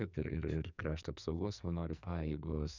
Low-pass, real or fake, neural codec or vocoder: 7.2 kHz; fake; codec, 44.1 kHz, 2.6 kbps, SNAC